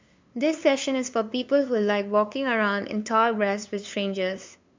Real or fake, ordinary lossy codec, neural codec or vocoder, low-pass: fake; MP3, 64 kbps; codec, 16 kHz, 2 kbps, FunCodec, trained on LibriTTS, 25 frames a second; 7.2 kHz